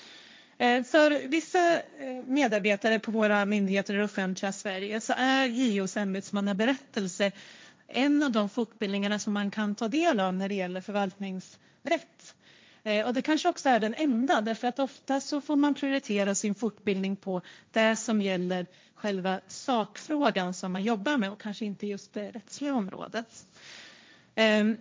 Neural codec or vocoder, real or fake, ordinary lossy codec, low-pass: codec, 16 kHz, 1.1 kbps, Voila-Tokenizer; fake; none; none